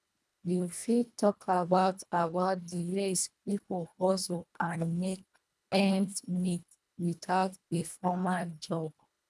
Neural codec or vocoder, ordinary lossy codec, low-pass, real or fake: codec, 24 kHz, 1.5 kbps, HILCodec; none; none; fake